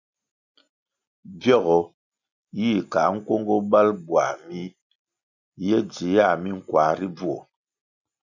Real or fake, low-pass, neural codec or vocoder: real; 7.2 kHz; none